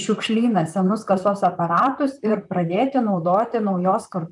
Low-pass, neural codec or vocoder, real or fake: 10.8 kHz; vocoder, 44.1 kHz, 128 mel bands, Pupu-Vocoder; fake